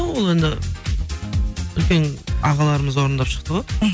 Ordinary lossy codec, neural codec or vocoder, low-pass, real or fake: none; none; none; real